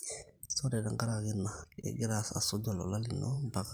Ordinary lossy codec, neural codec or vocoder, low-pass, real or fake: none; vocoder, 44.1 kHz, 128 mel bands every 512 samples, BigVGAN v2; none; fake